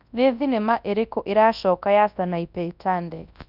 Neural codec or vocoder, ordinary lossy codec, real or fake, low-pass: codec, 24 kHz, 0.9 kbps, WavTokenizer, large speech release; none; fake; 5.4 kHz